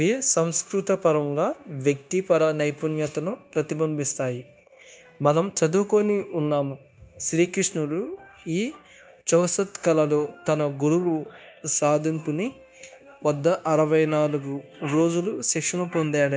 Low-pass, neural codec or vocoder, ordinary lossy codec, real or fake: none; codec, 16 kHz, 0.9 kbps, LongCat-Audio-Codec; none; fake